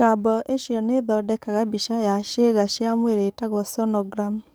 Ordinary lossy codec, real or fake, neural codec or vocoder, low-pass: none; fake; codec, 44.1 kHz, 7.8 kbps, DAC; none